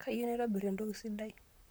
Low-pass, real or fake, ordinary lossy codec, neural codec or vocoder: none; fake; none; vocoder, 44.1 kHz, 128 mel bands, Pupu-Vocoder